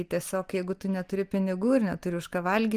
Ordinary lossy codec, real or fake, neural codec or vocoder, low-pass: Opus, 24 kbps; real; none; 14.4 kHz